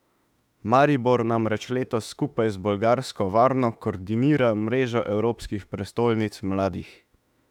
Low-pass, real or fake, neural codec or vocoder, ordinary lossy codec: 19.8 kHz; fake; autoencoder, 48 kHz, 32 numbers a frame, DAC-VAE, trained on Japanese speech; none